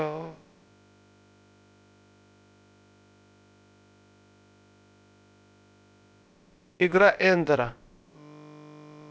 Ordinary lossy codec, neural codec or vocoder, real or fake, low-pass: none; codec, 16 kHz, about 1 kbps, DyCAST, with the encoder's durations; fake; none